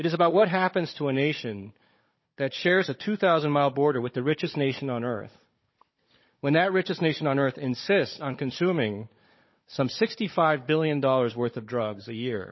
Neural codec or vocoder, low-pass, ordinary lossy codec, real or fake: codec, 16 kHz, 16 kbps, FunCodec, trained on Chinese and English, 50 frames a second; 7.2 kHz; MP3, 24 kbps; fake